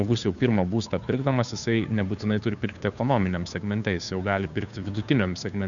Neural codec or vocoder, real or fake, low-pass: codec, 16 kHz, 2 kbps, FunCodec, trained on Chinese and English, 25 frames a second; fake; 7.2 kHz